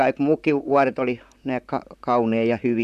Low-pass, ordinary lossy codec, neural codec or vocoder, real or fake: 14.4 kHz; none; vocoder, 44.1 kHz, 128 mel bands, Pupu-Vocoder; fake